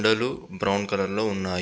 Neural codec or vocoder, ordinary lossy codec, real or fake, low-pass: none; none; real; none